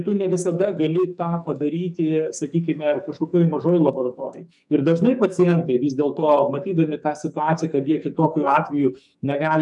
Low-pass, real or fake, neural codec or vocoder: 10.8 kHz; fake; codec, 44.1 kHz, 2.6 kbps, SNAC